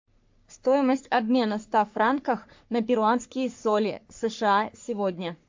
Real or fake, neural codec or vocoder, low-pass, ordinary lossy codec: fake; codec, 44.1 kHz, 3.4 kbps, Pupu-Codec; 7.2 kHz; MP3, 48 kbps